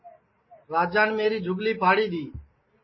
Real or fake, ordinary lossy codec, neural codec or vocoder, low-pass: real; MP3, 24 kbps; none; 7.2 kHz